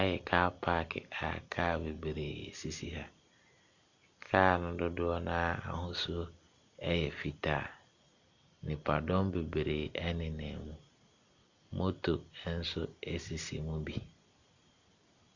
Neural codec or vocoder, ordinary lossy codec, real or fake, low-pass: none; Opus, 64 kbps; real; 7.2 kHz